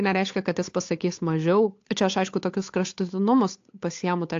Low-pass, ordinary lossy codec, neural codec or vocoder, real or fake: 7.2 kHz; AAC, 48 kbps; codec, 16 kHz, 8 kbps, FunCodec, trained on Chinese and English, 25 frames a second; fake